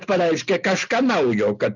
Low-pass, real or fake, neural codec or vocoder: 7.2 kHz; real; none